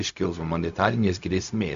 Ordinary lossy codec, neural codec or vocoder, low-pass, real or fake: MP3, 64 kbps; codec, 16 kHz, 0.4 kbps, LongCat-Audio-Codec; 7.2 kHz; fake